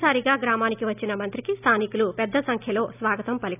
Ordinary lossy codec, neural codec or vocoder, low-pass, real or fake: none; none; 3.6 kHz; real